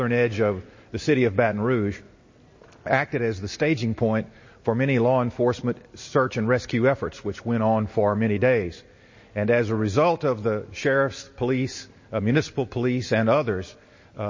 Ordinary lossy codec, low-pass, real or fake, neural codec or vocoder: MP3, 32 kbps; 7.2 kHz; real; none